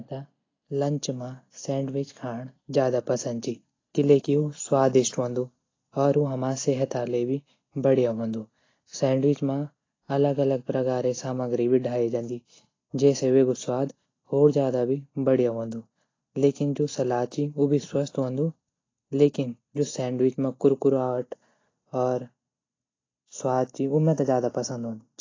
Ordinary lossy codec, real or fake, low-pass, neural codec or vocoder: AAC, 32 kbps; real; 7.2 kHz; none